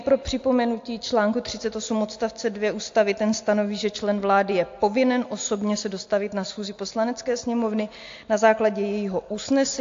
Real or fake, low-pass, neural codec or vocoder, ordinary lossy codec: real; 7.2 kHz; none; AAC, 48 kbps